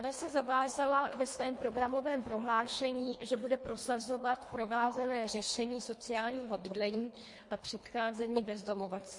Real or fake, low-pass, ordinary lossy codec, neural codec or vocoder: fake; 10.8 kHz; MP3, 48 kbps; codec, 24 kHz, 1.5 kbps, HILCodec